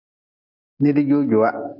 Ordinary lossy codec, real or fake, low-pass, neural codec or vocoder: AAC, 48 kbps; real; 5.4 kHz; none